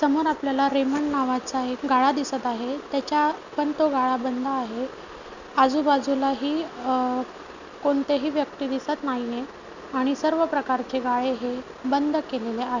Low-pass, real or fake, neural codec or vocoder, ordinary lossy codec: 7.2 kHz; real; none; none